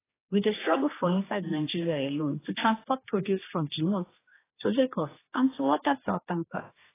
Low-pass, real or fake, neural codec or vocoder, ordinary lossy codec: 3.6 kHz; fake; codec, 16 kHz, 2 kbps, X-Codec, HuBERT features, trained on general audio; AAC, 16 kbps